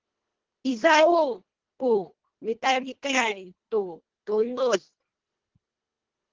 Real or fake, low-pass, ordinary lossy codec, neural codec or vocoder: fake; 7.2 kHz; Opus, 16 kbps; codec, 24 kHz, 1.5 kbps, HILCodec